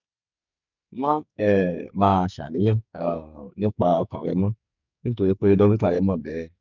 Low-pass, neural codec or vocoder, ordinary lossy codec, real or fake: 7.2 kHz; codec, 44.1 kHz, 2.6 kbps, SNAC; none; fake